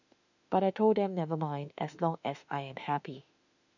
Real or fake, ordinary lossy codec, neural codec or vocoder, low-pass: fake; none; autoencoder, 48 kHz, 32 numbers a frame, DAC-VAE, trained on Japanese speech; 7.2 kHz